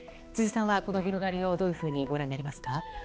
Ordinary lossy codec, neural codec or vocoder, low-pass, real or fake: none; codec, 16 kHz, 2 kbps, X-Codec, HuBERT features, trained on balanced general audio; none; fake